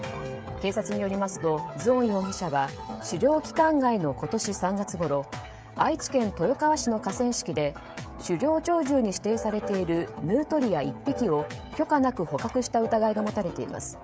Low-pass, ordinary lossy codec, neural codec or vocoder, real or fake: none; none; codec, 16 kHz, 16 kbps, FreqCodec, smaller model; fake